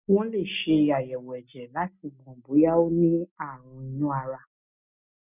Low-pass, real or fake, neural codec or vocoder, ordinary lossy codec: 3.6 kHz; real; none; none